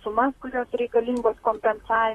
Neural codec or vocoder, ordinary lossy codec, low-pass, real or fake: vocoder, 44.1 kHz, 128 mel bands, Pupu-Vocoder; AAC, 32 kbps; 19.8 kHz; fake